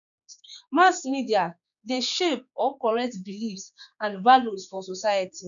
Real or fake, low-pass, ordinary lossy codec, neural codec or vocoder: fake; 7.2 kHz; none; codec, 16 kHz, 4 kbps, X-Codec, HuBERT features, trained on general audio